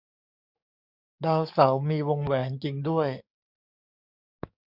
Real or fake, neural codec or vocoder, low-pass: fake; codec, 44.1 kHz, 7.8 kbps, DAC; 5.4 kHz